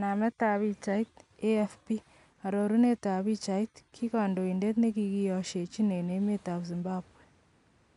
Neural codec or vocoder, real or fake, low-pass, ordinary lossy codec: none; real; 10.8 kHz; none